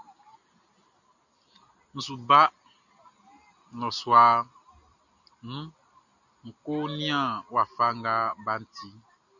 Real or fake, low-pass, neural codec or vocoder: real; 7.2 kHz; none